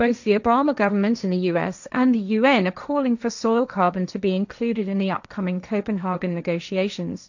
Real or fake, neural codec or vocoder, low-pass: fake; codec, 16 kHz, 1.1 kbps, Voila-Tokenizer; 7.2 kHz